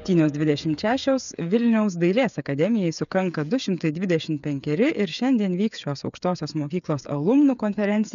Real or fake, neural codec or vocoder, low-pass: fake; codec, 16 kHz, 8 kbps, FreqCodec, smaller model; 7.2 kHz